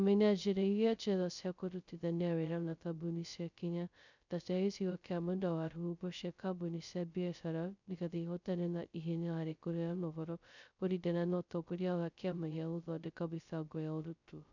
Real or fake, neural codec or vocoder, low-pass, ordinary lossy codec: fake; codec, 16 kHz, 0.2 kbps, FocalCodec; 7.2 kHz; none